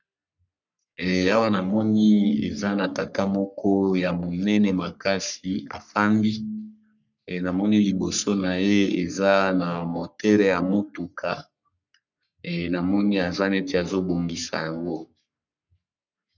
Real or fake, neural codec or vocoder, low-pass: fake; codec, 44.1 kHz, 3.4 kbps, Pupu-Codec; 7.2 kHz